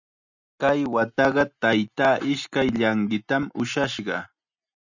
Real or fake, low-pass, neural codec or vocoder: real; 7.2 kHz; none